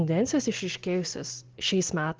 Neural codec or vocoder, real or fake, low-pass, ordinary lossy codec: none; real; 7.2 kHz; Opus, 16 kbps